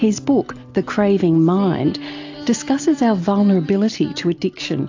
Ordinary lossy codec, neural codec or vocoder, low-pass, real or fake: AAC, 48 kbps; none; 7.2 kHz; real